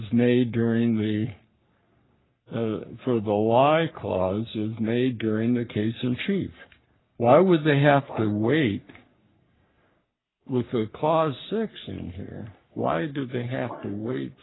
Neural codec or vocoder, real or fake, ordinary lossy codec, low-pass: codec, 44.1 kHz, 3.4 kbps, Pupu-Codec; fake; AAC, 16 kbps; 7.2 kHz